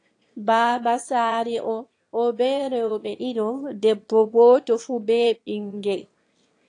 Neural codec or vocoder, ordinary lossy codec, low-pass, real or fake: autoencoder, 22.05 kHz, a latent of 192 numbers a frame, VITS, trained on one speaker; AAC, 48 kbps; 9.9 kHz; fake